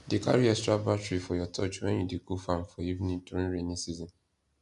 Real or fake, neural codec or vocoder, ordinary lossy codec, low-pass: real; none; none; 10.8 kHz